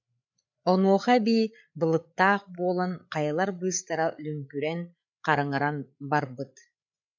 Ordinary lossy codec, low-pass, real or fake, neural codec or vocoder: MP3, 64 kbps; 7.2 kHz; fake; codec, 16 kHz, 8 kbps, FreqCodec, larger model